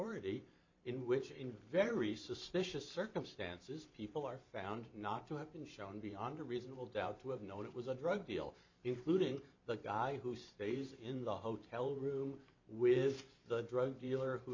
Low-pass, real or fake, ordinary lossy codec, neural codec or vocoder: 7.2 kHz; fake; MP3, 64 kbps; vocoder, 44.1 kHz, 128 mel bands every 512 samples, BigVGAN v2